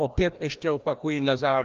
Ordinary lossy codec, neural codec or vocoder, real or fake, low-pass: Opus, 24 kbps; codec, 16 kHz, 1 kbps, FreqCodec, larger model; fake; 7.2 kHz